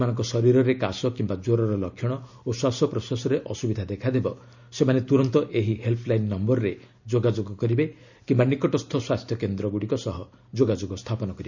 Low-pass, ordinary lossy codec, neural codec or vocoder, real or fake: 7.2 kHz; none; none; real